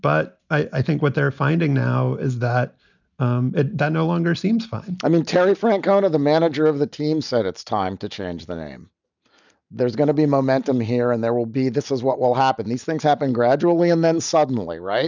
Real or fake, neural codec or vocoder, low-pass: real; none; 7.2 kHz